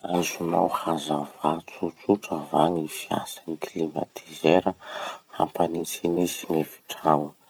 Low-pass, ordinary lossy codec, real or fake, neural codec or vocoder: none; none; fake; vocoder, 44.1 kHz, 128 mel bands every 512 samples, BigVGAN v2